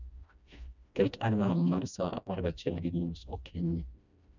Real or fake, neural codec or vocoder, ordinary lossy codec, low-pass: fake; codec, 16 kHz, 1 kbps, FreqCodec, smaller model; none; 7.2 kHz